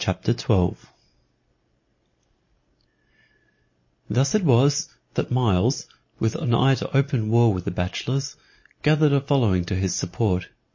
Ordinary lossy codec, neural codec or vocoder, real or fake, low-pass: MP3, 32 kbps; none; real; 7.2 kHz